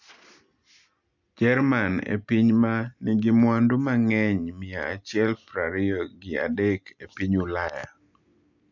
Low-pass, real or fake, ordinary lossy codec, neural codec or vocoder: 7.2 kHz; real; none; none